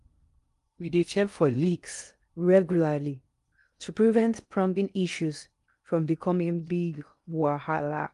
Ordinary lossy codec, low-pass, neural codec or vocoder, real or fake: Opus, 32 kbps; 10.8 kHz; codec, 16 kHz in and 24 kHz out, 0.6 kbps, FocalCodec, streaming, 2048 codes; fake